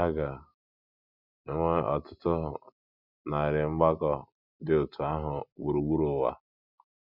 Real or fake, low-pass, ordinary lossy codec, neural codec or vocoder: real; 5.4 kHz; none; none